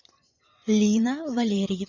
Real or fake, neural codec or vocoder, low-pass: fake; vocoder, 24 kHz, 100 mel bands, Vocos; 7.2 kHz